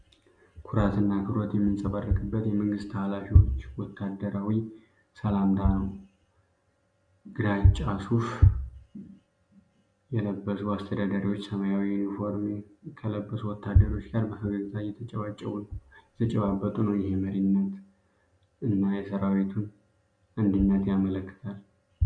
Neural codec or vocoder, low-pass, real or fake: none; 9.9 kHz; real